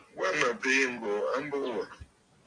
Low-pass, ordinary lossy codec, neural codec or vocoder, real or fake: 9.9 kHz; AAC, 48 kbps; none; real